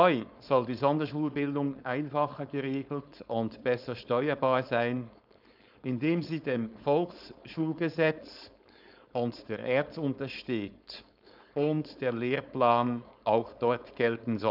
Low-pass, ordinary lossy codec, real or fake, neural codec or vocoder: 5.4 kHz; none; fake; codec, 16 kHz, 4.8 kbps, FACodec